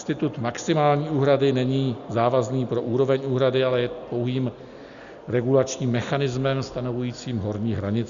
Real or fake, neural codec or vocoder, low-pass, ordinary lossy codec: real; none; 7.2 kHz; Opus, 64 kbps